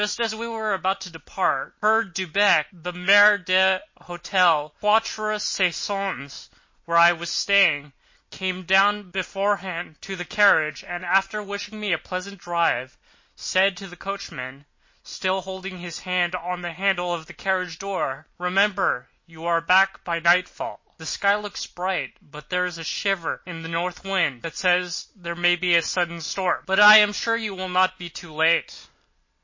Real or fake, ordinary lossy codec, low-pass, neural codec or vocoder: real; MP3, 32 kbps; 7.2 kHz; none